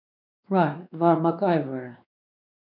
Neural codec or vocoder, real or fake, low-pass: codec, 16 kHz, 2 kbps, X-Codec, WavLM features, trained on Multilingual LibriSpeech; fake; 5.4 kHz